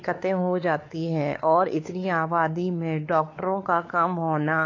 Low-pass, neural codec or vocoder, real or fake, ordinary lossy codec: 7.2 kHz; codec, 16 kHz, 4 kbps, X-Codec, WavLM features, trained on Multilingual LibriSpeech; fake; AAC, 32 kbps